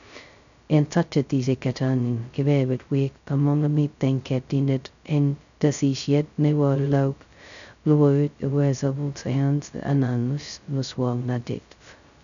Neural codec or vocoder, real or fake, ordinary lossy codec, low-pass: codec, 16 kHz, 0.2 kbps, FocalCodec; fake; none; 7.2 kHz